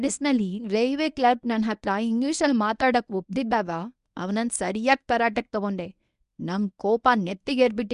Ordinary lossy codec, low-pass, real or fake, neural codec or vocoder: none; 10.8 kHz; fake; codec, 24 kHz, 0.9 kbps, WavTokenizer, medium speech release version 1